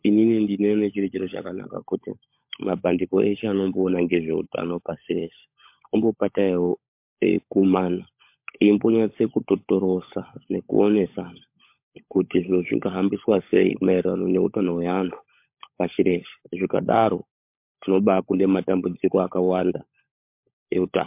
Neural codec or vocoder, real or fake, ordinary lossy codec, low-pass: codec, 16 kHz, 16 kbps, FunCodec, trained on LibriTTS, 50 frames a second; fake; MP3, 32 kbps; 3.6 kHz